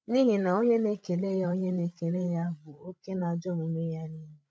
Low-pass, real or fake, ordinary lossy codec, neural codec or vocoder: none; fake; none; codec, 16 kHz, 16 kbps, FreqCodec, larger model